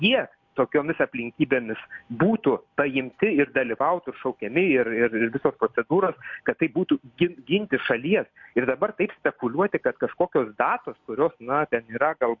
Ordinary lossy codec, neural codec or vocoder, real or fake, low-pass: MP3, 48 kbps; none; real; 7.2 kHz